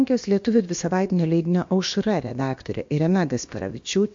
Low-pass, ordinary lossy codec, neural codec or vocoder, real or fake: 7.2 kHz; MP3, 48 kbps; codec, 16 kHz, about 1 kbps, DyCAST, with the encoder's durations; fake